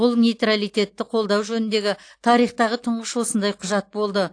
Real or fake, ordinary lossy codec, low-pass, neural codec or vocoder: real; AAC, 48 kbps; 9.9 kHz; none